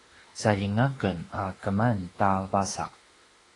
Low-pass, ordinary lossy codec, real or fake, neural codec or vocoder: 10.8 kHz; AAC, 32 kbps; fake; autoencoder, 48 kHz, 32 numbers a frame, DAC-VAE, trained on Japanese speech